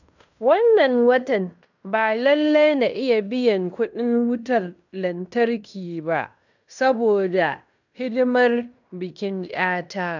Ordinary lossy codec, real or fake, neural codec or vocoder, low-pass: none; fake; codec, 16 kHz in and 24 kHz out, 0.9 kbps, LongCat-Audio-Codec, fine tuned four codebook decoder; 7.2 kHz